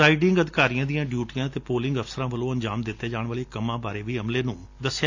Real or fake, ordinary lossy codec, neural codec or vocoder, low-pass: real; AAC, 48 kbps; none; 7.2 kHz